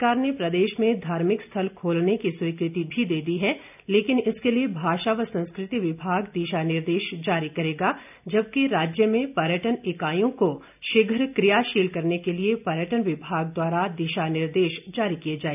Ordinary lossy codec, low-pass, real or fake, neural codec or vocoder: none; 3.6 kHz; real; none